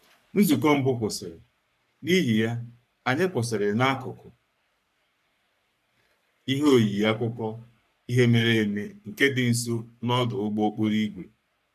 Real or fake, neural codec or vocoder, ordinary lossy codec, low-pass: fake; codec, 44.1 kHz, 3.4 kbps, Pupu-Codec; none; 14.4 kHz